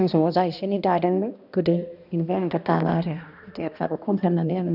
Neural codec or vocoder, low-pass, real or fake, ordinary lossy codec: codec, 16 kHz, 1 kbps, X-Codec, HuBERT features, trained on balanced general audio; 5.4 kHz; fake; none